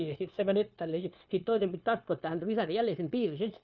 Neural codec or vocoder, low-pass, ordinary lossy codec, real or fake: codec, 16 kHz, 0.9 kbps, LongCat-Audio-Codec; 7.2 kHz; none; fake